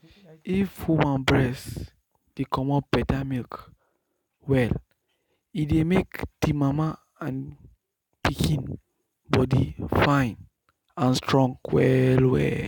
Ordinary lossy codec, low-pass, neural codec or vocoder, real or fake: none; 19.8 kHz; none; real